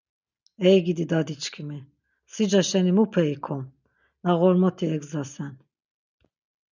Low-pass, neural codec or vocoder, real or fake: 7.2 kHz; none; real